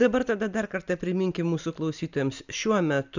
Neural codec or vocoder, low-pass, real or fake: none; 7.2 kHz; real